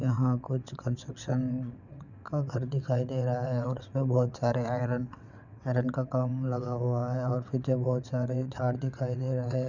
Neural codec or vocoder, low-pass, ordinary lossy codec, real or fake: vocoder, 22.05 kHz, 80 mel bands, WaveNeXt; 7.2 kHz; none; fake